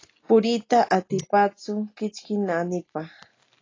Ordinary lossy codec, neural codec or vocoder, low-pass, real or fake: AAC, 32 kbps; none; 7.2 kHz; real